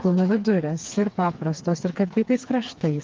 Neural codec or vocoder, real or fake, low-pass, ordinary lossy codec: codec, 16 kHz, 4 kbps, FreqCodec, smaller model; fake; 7.2 kHz; Opus, 24 kbps